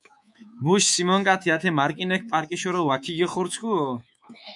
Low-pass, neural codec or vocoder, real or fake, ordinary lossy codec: 10.8 kHz; codec, 24 kHz, 3.1 kbps, DualCodec; fake; MP3, 96 kbps